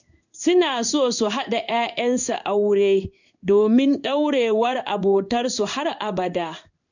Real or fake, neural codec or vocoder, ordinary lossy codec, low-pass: fake; codec, 16 kHz in and 24 kHz out, 1 kbps, XY-Tokenizer; none; 7.2 kHz